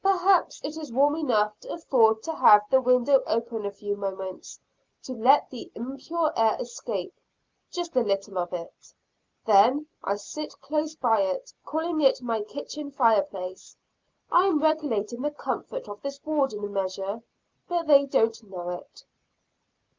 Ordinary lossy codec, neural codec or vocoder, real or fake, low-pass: Opus, 24 kbps; none; real; 7.2 kHz